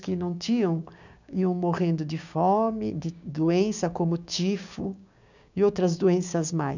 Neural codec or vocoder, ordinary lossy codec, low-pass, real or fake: codec, 16 kHz, 6 kbps, DAC; none; 7.2 kHz; fake